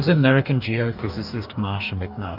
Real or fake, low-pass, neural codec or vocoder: fake; 5.4 kHz; codec, 44.1 kHz, 2.6 kbps, DAC